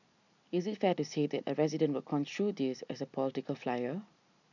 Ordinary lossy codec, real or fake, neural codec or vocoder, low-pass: none; real; none; 7.2 kHz